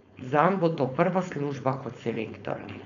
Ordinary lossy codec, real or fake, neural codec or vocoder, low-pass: none; fake; codec, 16 kHz, 4.8 kbps, FACodec; 7.2 kHz